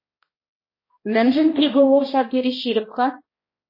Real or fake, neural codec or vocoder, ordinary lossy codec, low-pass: fake; codec, 16 kHz, 1 kbps, X-Codec, HuBERT features, trained on balanced general audio; MP3, 24 kbps; 5.4 kHz